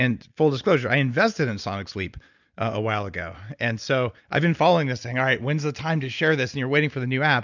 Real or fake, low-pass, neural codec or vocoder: real; 7.2 kHz; none